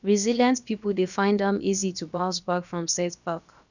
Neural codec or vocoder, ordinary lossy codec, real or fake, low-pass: codec, 16 kHz, about 1 kbps, DyCAST, with the encoder's durations; none; fake; 7.2 kHz